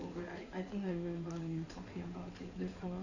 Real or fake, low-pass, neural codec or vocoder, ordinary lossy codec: fake; 7.2 kHz; codec, 16 kHz in and 24 kHz out, 2.2 kbps, FireRedTTS-2 codec; none